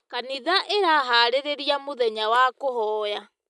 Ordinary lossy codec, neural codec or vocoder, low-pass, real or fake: none; none; none; real